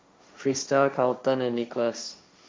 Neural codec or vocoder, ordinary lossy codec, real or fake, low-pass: codec, 16 kHz, 1.1 kbps, Voila-Tokenizer; none; fake; none